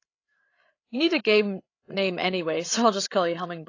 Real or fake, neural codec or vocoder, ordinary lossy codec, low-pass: fake; codec, 16 kHz, 8 kbps, FreqCodec, larger model; AAC, 32 kbps; 7.2 kHz